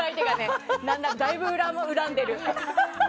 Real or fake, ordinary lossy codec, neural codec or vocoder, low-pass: real; none; none; none